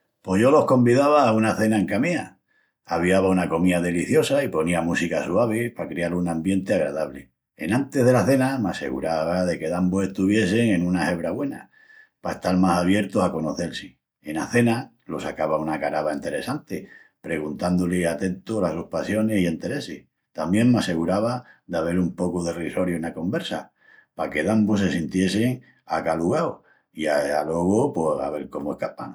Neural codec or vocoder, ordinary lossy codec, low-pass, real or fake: none; none; 19.8 kHz; real